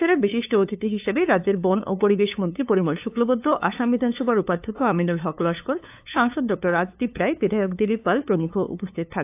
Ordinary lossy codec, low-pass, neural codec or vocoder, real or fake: AAC, 32 kbps; 3.6 kHz; codec, 16 kHz, 2 kbps, FunCodec, trained on LibriTTS, 25 frames a second; fake